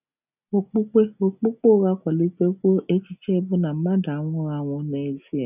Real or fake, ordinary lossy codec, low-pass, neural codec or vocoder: real; none; 3.6 kHz; none